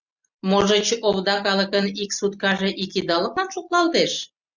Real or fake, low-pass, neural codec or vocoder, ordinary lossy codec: real; 7.2 kHz; none; Opus, 64 kbps